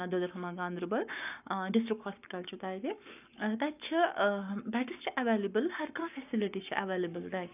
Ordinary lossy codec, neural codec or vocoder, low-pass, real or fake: none; codec, 44.1 kHz, 7.8 kbps, Pupu-Codec; 3.6 kHz; fake